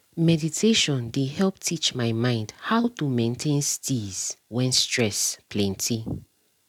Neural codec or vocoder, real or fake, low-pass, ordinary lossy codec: none; real; 19.8 kHz; none